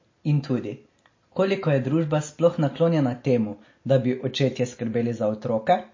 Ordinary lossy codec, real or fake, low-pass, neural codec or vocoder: MP3, 32 kbps; real; 7.2 kHz; none